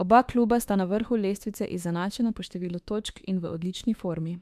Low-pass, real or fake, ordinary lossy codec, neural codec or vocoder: 14.4 kHz; fake; MP3, 96 kbps; autoencoder, 48 kHz, 32 numbers a frame, DAC-VAE, trained on Japanese speech